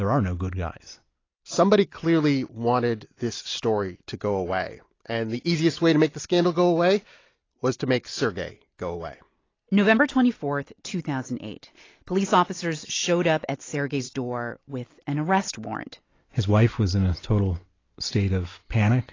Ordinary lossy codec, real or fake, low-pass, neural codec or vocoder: AAC, 32 kbps; real; 7.2 kHz; none